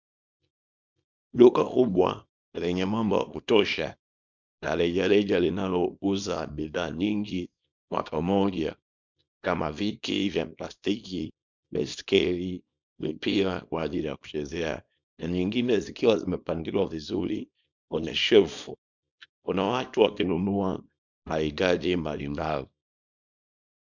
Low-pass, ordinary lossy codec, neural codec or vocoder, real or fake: 7.2 kHz; MP3, 64 kbps; codec, 24 kHz, 0.9 kbps, WavTokenizer, small release; fake